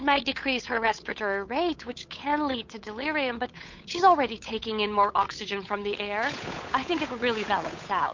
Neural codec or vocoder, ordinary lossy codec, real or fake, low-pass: codec, 16 kHz, 8 kbps, FunCodec, trained on Chinese and English, 25 frames a second; MP3, 48 kbps; fake; 7.2 kHz